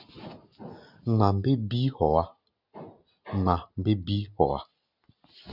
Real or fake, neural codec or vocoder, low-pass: real; none; 5.4 kHz